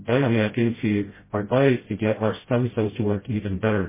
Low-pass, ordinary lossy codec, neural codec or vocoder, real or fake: 3.6 kHz; MP3, 16 kbps; codec, 16 kHz, 0.5 kbps, FreqCodec, smaller model; fake